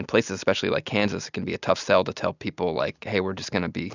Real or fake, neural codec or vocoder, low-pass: real; none; 7.2 kHz